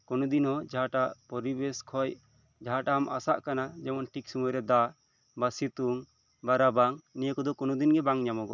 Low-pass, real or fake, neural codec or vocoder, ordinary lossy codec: 7.2 kHz; real; none; none